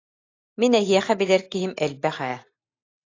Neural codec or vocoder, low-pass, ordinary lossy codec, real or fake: none; 7.2 kHz; AAC, 32 kbps; real